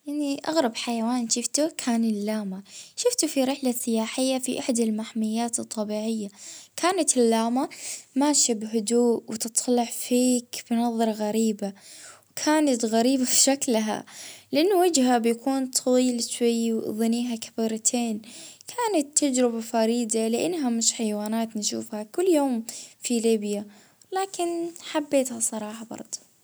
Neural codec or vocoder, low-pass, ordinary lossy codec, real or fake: none; none; none; real